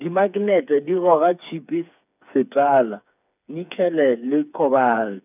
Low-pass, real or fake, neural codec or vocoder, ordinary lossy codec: 3.6 kHz; fake; codec, 16 kHz, 4 kbps, FreqCodec, smaller model; none